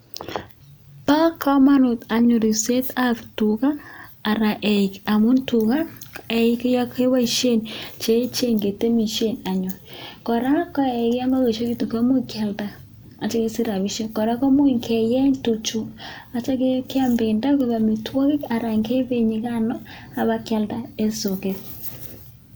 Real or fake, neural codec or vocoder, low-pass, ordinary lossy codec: real; none; none; none